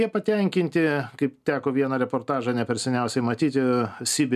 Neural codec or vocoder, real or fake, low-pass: none; real; 14.4 kHz